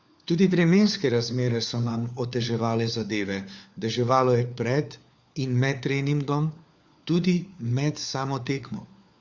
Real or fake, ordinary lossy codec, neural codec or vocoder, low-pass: fake; Opus, 64 kbps; codec, 16 kHz, 4 kbps, FunCodec, trained on LibriTTS, 50 frames a second; 7.2 kHz